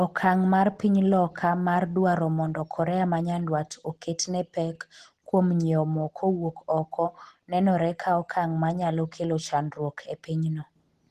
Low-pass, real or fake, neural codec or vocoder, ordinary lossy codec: 14.4 kHz; real; none; Opus, 16 kbps